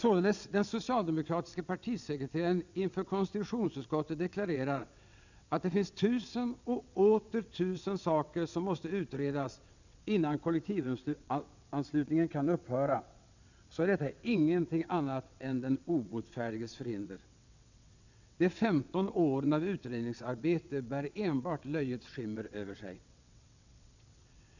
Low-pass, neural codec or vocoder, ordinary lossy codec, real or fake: 7.2 kHz; vocoder, 22.05 kHz, 80 mel bands, WaveNeXt; none; fake